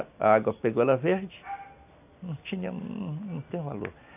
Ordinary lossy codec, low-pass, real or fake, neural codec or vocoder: AAC, 32 kbps; 3.6 kHz; real; none